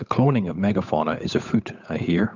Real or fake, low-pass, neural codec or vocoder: fake; 7.2 kHz; codec, 16 kHz, 8 kbps, FunCodec, trained on Chinese and English, 25 frames a second